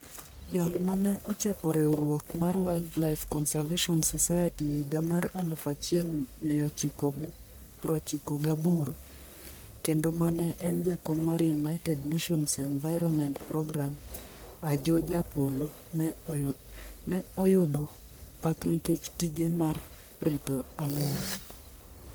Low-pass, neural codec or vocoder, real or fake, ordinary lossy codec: none; codec, 44.1 kHz, 1.7 kbps, Pupu-Codec; fake; none